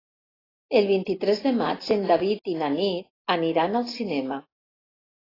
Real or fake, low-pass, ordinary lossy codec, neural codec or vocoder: real; 5.4 kHz; AAC, 24 kbps; none